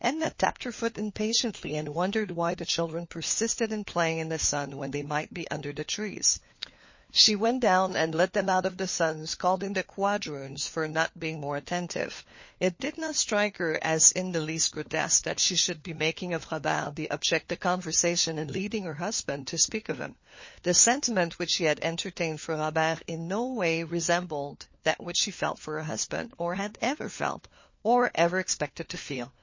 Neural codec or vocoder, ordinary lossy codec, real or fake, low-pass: codec, 16 kHz, 4 kbps, FunCodec, trained on LibriTTS, 50 frames a second; MP3, 32 kbps; fake; 7.2 kHz